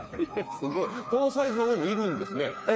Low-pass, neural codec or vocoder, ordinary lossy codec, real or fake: none; codec, 16 kHz, 4 kbps, FreqCodec, smaller model; none; fake